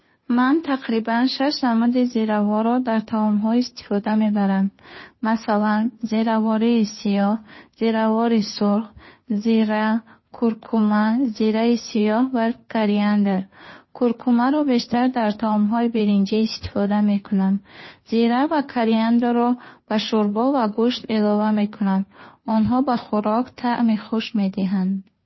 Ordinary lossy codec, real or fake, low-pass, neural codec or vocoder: MP3, 24 kbps; fake; 7.2 kHz; codec, 16 kHz, 2 kbps, FunCodec, trained on Chinese and English, 25 frames a second